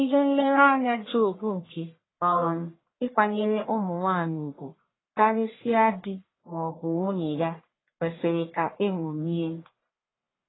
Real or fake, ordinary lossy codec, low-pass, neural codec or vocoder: fake; AAC, 16 kbps; 7.2 kHz; codec, 44.1 kHz, 1.7 kbps, Pupu-Codec